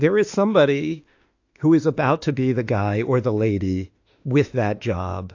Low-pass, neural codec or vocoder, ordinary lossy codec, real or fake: 7.2 kHz; autoencoder, 48 kHz, 32 numbers a frame, DAC-VAE, trained on Japanese speech; AAC, 48 kbps; fake